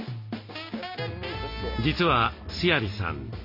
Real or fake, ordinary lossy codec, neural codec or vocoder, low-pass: real; MP3, 32 kbps; none; 5.4 kHz